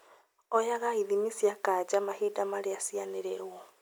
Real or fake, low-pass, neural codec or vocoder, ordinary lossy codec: real; none; none; none